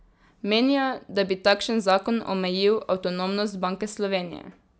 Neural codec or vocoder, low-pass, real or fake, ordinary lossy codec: none; none; real; none